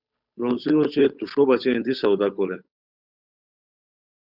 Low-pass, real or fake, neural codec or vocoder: 5.4 kHz; fake; codec, 16 kHz, 8 kbps, FunCodec, trained on Chinese and English, 25 frames a second